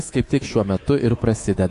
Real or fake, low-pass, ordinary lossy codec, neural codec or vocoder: fake; 10.8 kHz; AAC, 48 kbps; codec, 24 kHz, 3.1 kbps, DualCodec